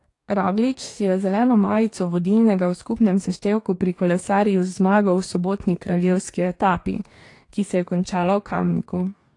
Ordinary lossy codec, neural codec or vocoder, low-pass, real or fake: AAC, 48 kbps; codec, 44.1 kHz, 2.6 kbps, DAC; 10.8 kHz; fake